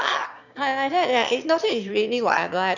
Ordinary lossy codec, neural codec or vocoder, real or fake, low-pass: none; autoencoder, 22.05 kHz, a latent of 192 numbers a frame, VITS, trained on one speaker; fake; 7.2 kHz